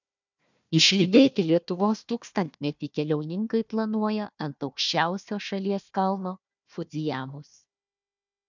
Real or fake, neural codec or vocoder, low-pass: fake; codec, 16 kHz, 1 kbps, FunCodec, trained on Chinese and English, 50 frames a second; 7.2 kHz